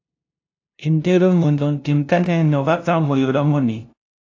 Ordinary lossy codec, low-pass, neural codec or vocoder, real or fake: AAC, 48 kbps; 7.2 kHz; codec, 16 kHz, 0.5 kbps, FunCodec, trained on LibriTTS, 25 frames a second; fake